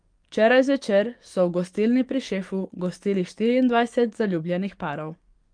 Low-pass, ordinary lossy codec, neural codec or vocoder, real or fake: 9.9 kHz; Opus, 32 kbps; codec, 44.1 kHz, 7.8 kbps, DAC; fake